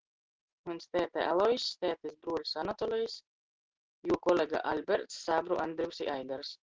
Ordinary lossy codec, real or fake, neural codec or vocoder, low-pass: Opus, 16 kbps; real; none; 7.2 kHz